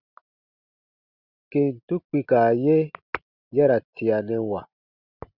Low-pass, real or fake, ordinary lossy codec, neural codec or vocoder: 5.4 kHz; real; Opus, 64 kbps; none